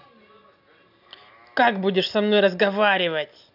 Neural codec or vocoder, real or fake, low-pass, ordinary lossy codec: none; real; 5.4 kHz; AAC, 48 kbps